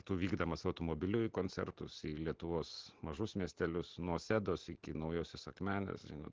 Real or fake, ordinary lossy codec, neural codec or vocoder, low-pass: real; Opus, 16 kbps; none; 7.2 kHz